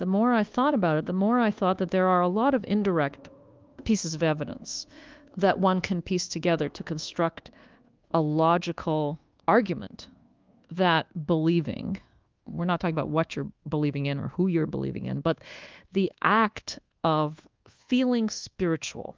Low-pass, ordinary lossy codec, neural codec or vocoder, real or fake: 7.2 kHz; Opus, 32 kbps; codec, 24 kHz, 1.2 kbps, DualCodec; fake